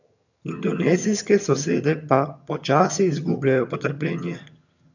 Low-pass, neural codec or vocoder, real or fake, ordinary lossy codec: 7.2 kHz; vocoder, 22.05 kHz, 80 mel bands, HiFi-GAN; fake; none